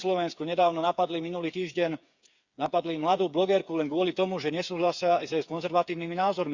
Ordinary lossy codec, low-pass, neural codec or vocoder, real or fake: Opus, 64 kbps; 7.2 kHz; codec, 16 kHz, 8 kbps, FreqCodec, smaller model; fake